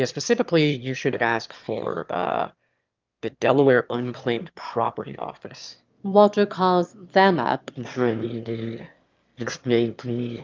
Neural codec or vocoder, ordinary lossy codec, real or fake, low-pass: autoencoder, 22.05 kHz, a latent of 192 numbers a frame, VITS, trained on one speaker; Opus, 24 kbps; fake; 7.2 kHz